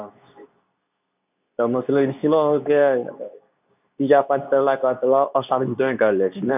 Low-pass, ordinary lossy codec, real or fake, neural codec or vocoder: 3.6 kHz; none; fake; codec, 24 kHz, 0.9 kbps, WavTokenizer, medium speech release version 2